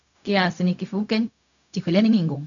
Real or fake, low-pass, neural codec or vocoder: fake; 7.2 kHz; codec, 16 kHz, 0.4 kbps, LongCat-Audio-Codec